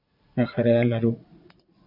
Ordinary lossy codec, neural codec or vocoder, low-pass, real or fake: MP3, 48 kbps; vocoder, 44.1 kHz, 80 mel bands, Vocos; 5.4 kHz; fake